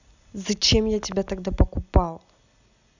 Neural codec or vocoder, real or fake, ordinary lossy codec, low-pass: none; real; none; 7.2 kHz